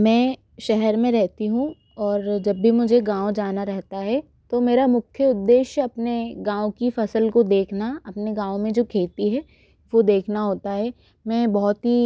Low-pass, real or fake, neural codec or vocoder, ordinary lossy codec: none; real; none; none